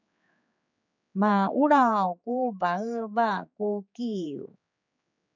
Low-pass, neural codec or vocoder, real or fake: 7.2 kHz; codec, 16 kHz, 4 kbps, X-Codec, HuBERT features, trained on general audio; fake